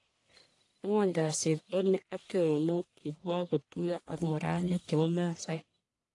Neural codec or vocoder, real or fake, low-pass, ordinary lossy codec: codec, 44.1 kHz, 1.7 kbps, Pupu-Codec; fake; 10.8 kHz; AAC, 48 kbps